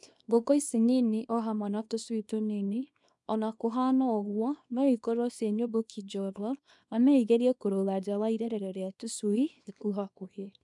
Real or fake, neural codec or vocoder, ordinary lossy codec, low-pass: fake; codec, 24 kHz, 0.9 kbps, WavTokenizer, small release; none; 10.8 kHz